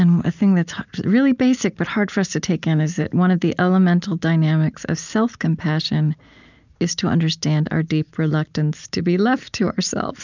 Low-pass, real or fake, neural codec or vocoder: 7.2 kHz; real; none